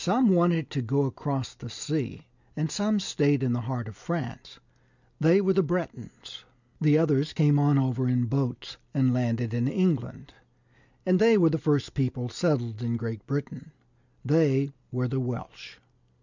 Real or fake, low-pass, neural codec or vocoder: real; 7.2 kHz; none